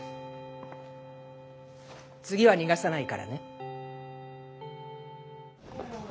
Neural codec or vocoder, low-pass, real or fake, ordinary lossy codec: none; none; real; none